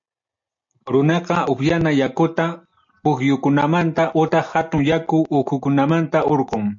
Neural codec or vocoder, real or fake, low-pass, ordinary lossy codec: none; real; 7.2 kHz; MP3, 48 kbps